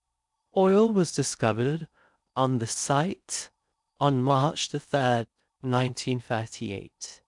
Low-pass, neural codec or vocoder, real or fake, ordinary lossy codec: 10.8 kHz; codec, 16 kHz in and 24 kHz out, 0.6 kbps, FocalCodec, streaming, 4096 codes; fake; none